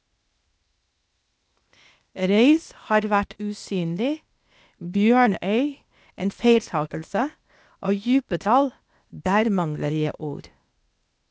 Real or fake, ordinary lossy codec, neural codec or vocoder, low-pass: fake; none; codec, 16 kHz, 0.8 kbps, ZipCodec; none